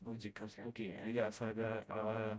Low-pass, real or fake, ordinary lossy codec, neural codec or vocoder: none; fake; none; codec, 16 kHz, 0.5 kbps, FreqCodec, smaller model